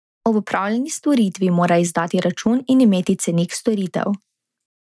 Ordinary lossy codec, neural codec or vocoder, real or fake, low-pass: none; none; real; none